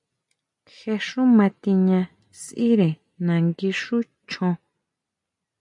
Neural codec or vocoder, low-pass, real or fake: none; 10.8 kHz; real